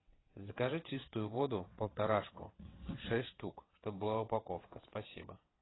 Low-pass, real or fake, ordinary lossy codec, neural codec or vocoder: 7.2 kHz; fake; AAC, 16 kbps; vocoder, 22.05 kHz, 80 mel bands, WaveNeXt